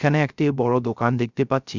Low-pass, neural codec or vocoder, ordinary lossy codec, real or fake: 7.2 kHz; codec, 16 kHz, 0.3 kbps, FocalCodec; Opus, 64 kbps; fake